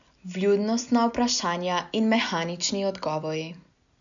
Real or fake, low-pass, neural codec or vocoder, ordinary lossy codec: real; 7.2 kHz; none; none